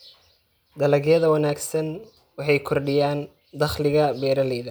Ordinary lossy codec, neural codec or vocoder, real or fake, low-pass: none; none; real; none